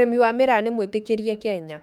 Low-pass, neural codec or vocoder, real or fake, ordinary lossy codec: 19.8 kHz; autoencoder, 48 kHz, 32 numbers a frame, DAC-VAE, trained on Japanese speech; fake; MP3, 96 kbps